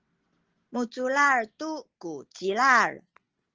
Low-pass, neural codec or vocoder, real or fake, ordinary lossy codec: 7.2 kHz; none; real; Opus, 16 kbps